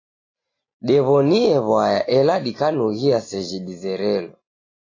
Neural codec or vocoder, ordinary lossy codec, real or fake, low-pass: none; AAC, 32 kbps; real; 7.2 kHz